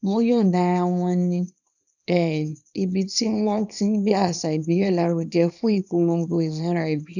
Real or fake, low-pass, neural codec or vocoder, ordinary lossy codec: fake; 7.2 kHz; codec, 24 kHz, 0.9 kbps, WavTokenizer, small release; none